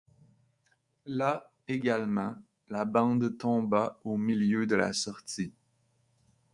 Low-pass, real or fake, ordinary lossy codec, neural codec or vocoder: 10.8 kHz; fake; Opus, 64 kbps; codec, 24 kHz, 3.1 kbps, DualCodec